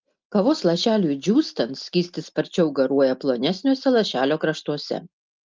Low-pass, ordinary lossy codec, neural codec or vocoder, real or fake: 7.2 kHz; Opus, 24 kbps; none; real